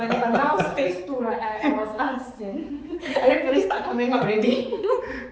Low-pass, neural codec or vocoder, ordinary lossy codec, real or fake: none; codec, 16 kHz, 4 kbps, X-Codec, HuBERT features, trained on balanced general audio; none; fake